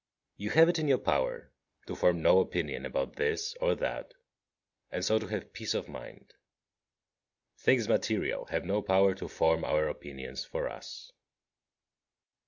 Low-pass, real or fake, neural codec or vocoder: 7.2 kHz; real; none